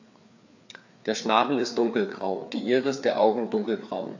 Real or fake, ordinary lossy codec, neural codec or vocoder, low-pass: fake; AAC, 48 kbps; codec, 16 kHz, 4 kbps, FreqCodec, larger model; 7.2 kHz